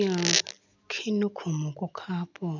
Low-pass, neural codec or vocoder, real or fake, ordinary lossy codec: 7.2 kHz; none; real; none